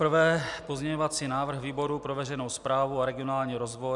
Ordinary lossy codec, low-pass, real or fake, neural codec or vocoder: MP3, 96 kbps; 10.8 kHz; real; none